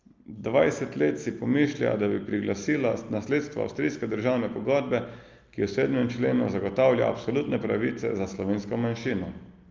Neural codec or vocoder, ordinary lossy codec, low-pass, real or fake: none; Opus, 24 kbps; 7.2 kHz; real